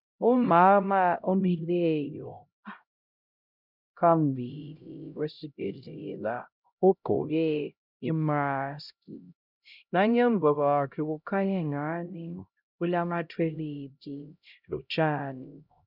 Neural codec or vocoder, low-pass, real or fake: codec, 16 kHz, 0.5 kbps, X-Codec, HuBERT features, trained on LibriSpeech; 5.4 kHz; fake